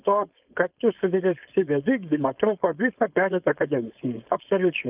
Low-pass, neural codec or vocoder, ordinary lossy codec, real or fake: 3.6 kHz; codec, 16 kHz, 4.8 kbps, FACodec; Opus, 24 kbps; fake